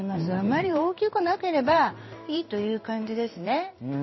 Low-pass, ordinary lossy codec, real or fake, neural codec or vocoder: 7.2 kHz; MP3, 24 kbps; fake; codec, 16 kHz in and 24 kHz out, 1 kbps, XY-Tokenizer